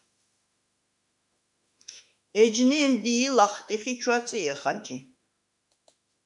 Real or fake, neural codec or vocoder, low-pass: fake; autoencoder, 48 kHz, 32 numbers a frame, DAC-VAE, trained on Japanese speech; 10.8 kHz